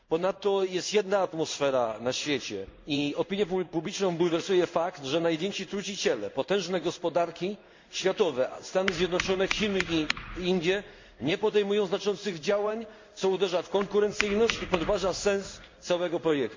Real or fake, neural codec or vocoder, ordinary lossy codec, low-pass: fake; codec, 16 kHz in and 24 kHz out, 1 kbps, XY-Tokenizer; MP3, 48 kbps; 7.2 kHz